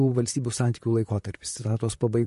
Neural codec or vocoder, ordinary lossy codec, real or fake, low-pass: vocoder, 44.1 kHz, 128 mel bands, Pupu-Vocoder; MP3, 48 kbps; fake; 14.4 kHz